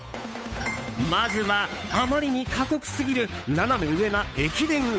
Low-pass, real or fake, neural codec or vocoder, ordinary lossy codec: none; fake; codec, 16 kHz, 8 kbps, FunCodec, trained on Chinese and English, 25 frames a second; none